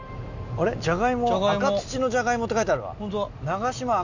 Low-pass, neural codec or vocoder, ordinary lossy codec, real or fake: 7.2 kHz; none; none; real